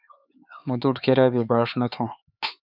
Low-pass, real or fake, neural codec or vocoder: 5.4 kHz; fake; codec, 16 kHz, 4 kbps, X-Codec, HuBERT features, trained on LibriSpeech